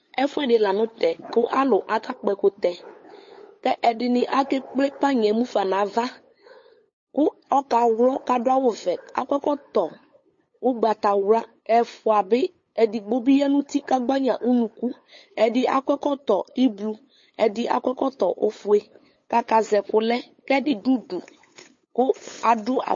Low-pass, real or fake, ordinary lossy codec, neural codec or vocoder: 7.2 kHz; fake; MP3, 32 kbps; codec, 16 kHz, 8 kbps, FunCodec, trained on LibriTTS, 25 frames a second